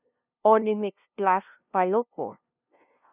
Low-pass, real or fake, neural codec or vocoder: 3.6 kHz; fake; codec, 16 kHz, 0.5 kbps, FunCodec, trained on LibriTTS, 25 frames a second